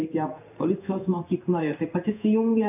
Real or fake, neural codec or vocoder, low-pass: fake; codec, 16 kHz in and 24 kHz out, 1 kbps, XY-Tokenizer; 3.6 kHz